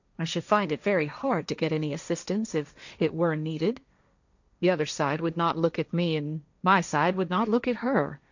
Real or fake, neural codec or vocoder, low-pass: fake; codec, 16 kHz, 1.1 kbps, Voila-Tokenizer; 7.2 kHz